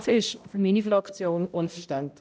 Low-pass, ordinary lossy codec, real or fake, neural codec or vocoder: none; none; fake; codec, 16 kHz, 0.5 kbps, X-Codec, HuBERT features, trained on balanced general audio